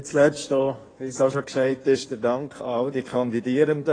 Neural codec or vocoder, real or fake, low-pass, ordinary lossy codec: codec, 16 kHz in and 24 kHz out, 1.1 kbps, FireRedTTS-2 codec; fake; 9.9 kHz; AAC, 32 kbps